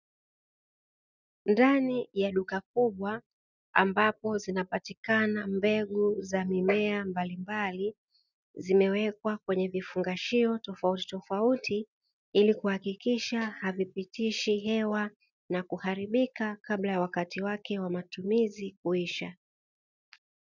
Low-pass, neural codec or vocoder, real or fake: 7.2 kHz; none; real